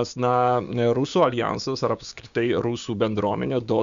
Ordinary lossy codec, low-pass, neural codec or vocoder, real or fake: Opus, 64 kbps; 7.2 kHz; codec, 16 kHz, 6 kbps, DAC; fake